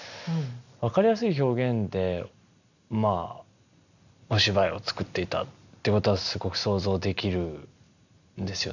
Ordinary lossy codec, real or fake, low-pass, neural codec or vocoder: none; real; 7.2 kHz; none